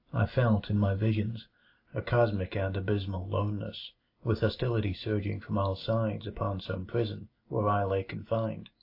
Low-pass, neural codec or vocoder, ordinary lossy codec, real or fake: 5.4 kHz; none; AAC, 32 kbps; real